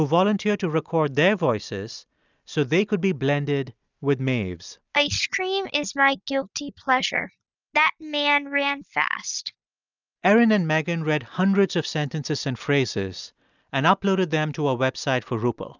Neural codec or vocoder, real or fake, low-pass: none; real; 7.2 kHz